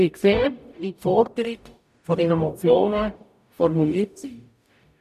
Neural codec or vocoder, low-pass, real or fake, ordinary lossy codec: codec, 44.1 kHz, 0.9 kbps, DAC; 14.4 kHz; fake; none